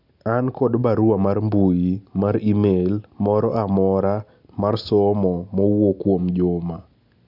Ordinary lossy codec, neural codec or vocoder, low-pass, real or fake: none; none; 5.4 kHz; real